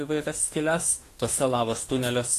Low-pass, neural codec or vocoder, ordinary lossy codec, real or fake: 14.4 kHz; autoencoder, 48 kHz, 32 numbers a frame, DAC-VAE, trained on Japanese speech; AAC, 48 kbps; fake